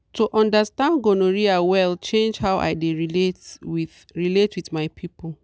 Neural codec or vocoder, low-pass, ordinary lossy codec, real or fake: none; none; none; real